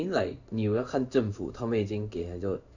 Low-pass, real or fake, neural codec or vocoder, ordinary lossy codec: 7.2 kHz; fake; codec, 16 kHz in and 24 kHz out, 1 kbps, XY-Tokenizer; none